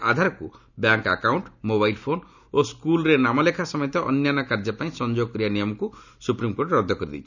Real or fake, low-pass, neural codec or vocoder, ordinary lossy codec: real; 7.2 kHz; none; none